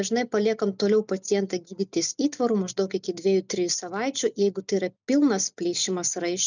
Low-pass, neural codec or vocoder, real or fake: 7.2 kHz; none; real